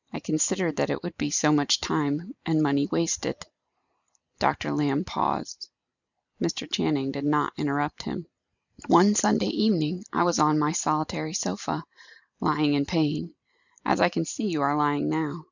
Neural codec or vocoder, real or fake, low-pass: none; real; 7.2 kHz